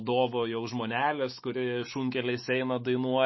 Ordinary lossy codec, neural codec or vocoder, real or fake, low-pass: MP3, 24 kbps; autoencoder, 48 kHz, 128 numbers a frame, DAC-VAE, trained on Japanese speech; fake; 7.2 kHz